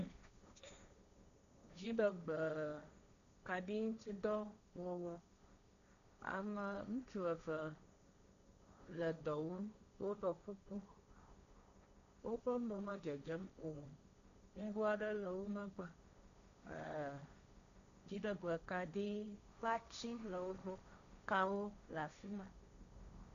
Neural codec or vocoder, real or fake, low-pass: codec, 16 kHz, 1.1 kbps, Voila-Tokenizer; fake; 7.2 kHz